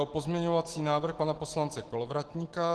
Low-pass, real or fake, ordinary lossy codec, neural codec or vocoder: 10.8 kHz; fake; Opus, 16 kbps; autoencoder, 48 kHz, 128 numbers a frame, DAC-VAE, trained on Japanese speech